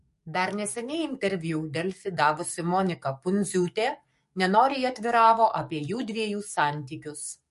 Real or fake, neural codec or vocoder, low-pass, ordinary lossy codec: fake; codec, 44.1 kHz, 7.8 kbps, DAC; 14.4 kHz; MP3, 48 kbps